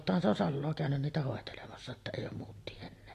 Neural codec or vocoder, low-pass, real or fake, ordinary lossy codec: none; 14.4 kHz; real; AAC, 48 kbps